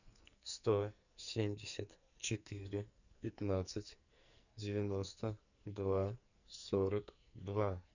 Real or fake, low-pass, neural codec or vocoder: fake; 7.2 kHz; codec, 44.1 kHz, 2.6 kbps, SNAC